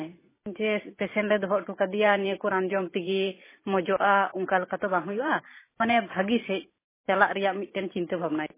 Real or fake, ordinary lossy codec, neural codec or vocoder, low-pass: fake; MP3, 16 kbps; vocoder, 44.1 kHz, 128 mel bands every 512 samples, BigVGAN v2; 3.6 kHz